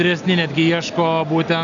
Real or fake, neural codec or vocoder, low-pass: real; none; 7.2 kHz